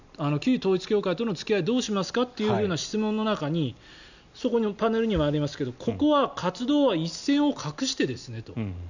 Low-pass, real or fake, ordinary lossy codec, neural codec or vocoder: 7.2 kHz; real; none; none